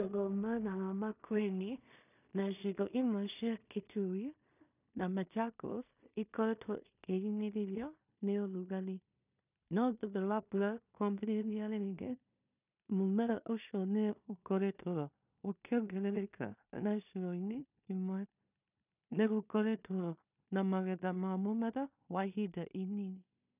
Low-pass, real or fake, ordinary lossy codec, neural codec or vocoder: 3.6 kHz; fake; none; codec, 16 kHz in and 24 kHz out, 0.4 kbps, LongCat-Audio-Codec, two codebook decoder